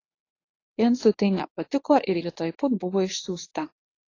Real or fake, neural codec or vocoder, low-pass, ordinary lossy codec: fake; codec, 24 kHz, 0.9 kbps, WavTokenizer, medium speech release version 1; 7.2 kHz; AAC, 32 kbps